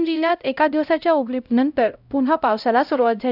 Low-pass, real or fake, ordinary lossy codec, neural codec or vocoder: 5.4 kHz; fake; none; codec, 16 kHz, 0.5 kbps, X-Codec, HuBERT features, trained on LibriSpeech